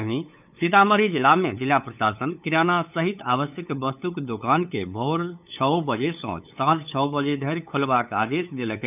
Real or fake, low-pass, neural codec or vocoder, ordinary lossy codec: fake; 3.6 kHz; codec, 16 kHz, 8 kbps, FunCodec, trained on LibriTTS, 25 frames a second; none